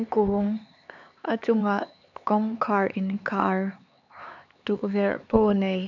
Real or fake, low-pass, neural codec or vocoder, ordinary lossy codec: fake; 7.2 kHz; codec, 16 kHz, 4 kbps, X-Codec, HuBERT features, trained on LibriSpeech; none